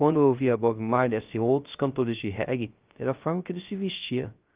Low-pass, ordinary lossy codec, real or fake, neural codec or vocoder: 3.6 kHz; Opus, 32 kbps; fake; codec, 16 kHz, 0.3 kbps, FocalCodec